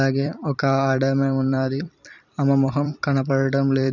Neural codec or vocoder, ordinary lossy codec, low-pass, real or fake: vocoder, 44.1 kHz, 128 mel bands every 256 samples, BigVGAN v2; none; 7.2 kHz; fake